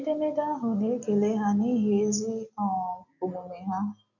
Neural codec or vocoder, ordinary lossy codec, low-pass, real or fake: none; none; 7.2 kHz; real